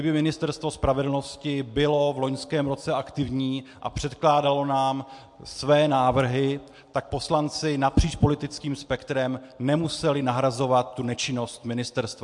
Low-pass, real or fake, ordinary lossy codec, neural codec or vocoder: 10.8 kHz; real; MP3, 64 kbps; none